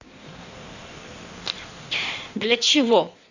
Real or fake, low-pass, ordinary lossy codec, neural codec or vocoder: fake; 7.2 kHz; none; codec, 16 kHz in and 24 kHz out, 1.1 kbps, FireRedTTS-2 codec